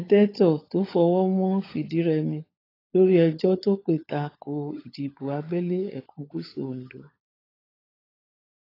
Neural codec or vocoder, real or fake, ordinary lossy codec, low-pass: codec, 16 kHz, 16 kbps, FunCodec, trained on LibriTTS, 50 frames a second; fake; AAC, 24 kbps; 5.4 kHz